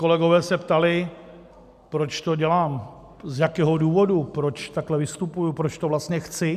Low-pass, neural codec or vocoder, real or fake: 14.4 kHz; none; real